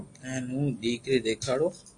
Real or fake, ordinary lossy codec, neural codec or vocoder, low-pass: real; AAC, 48 kbps; none; 10.8 kHz